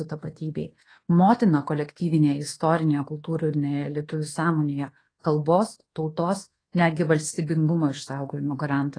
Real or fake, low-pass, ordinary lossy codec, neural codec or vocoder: fake; 9.9 kHz; AAC, 32 kbps; codec, 24 kHz, 1.2 kbps, DualCodec